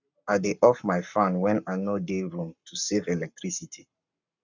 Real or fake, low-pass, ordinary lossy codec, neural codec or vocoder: fake; 7.2 kHz; none; codec, 44.1 kHz, 7.8 kbps, Pupu-Codec